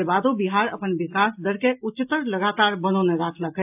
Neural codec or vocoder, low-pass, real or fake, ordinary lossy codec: none; 3.6 kHz; real; AAC, 32 kbps